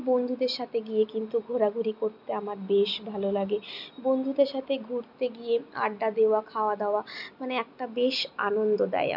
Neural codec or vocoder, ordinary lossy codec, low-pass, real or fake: none; none; 5.4 kHz; real